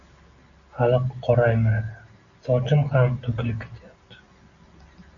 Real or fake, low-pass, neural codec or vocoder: real; 7.2 kHz; none